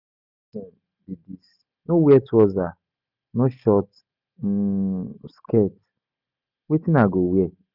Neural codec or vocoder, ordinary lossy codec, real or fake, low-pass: none; none; real; 5.4 kHz